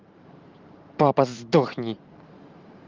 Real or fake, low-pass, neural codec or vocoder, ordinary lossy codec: fake; 7.2 kHz; vocoder, 44.1 kHz, 128 mel bands every 512 samples, BigVGAN v2; Opus, 32 kbps